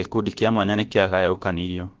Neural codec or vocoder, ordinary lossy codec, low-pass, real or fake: codec, 16 kHz, about 1 kbps, DyCAST, with the encoder's durations; Opus, 16 kbps; 7.2 kHz; fake